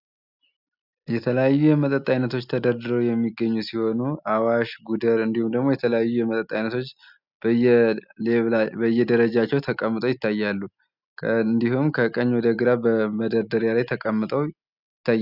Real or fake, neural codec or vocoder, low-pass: real; none; 5.4 kHz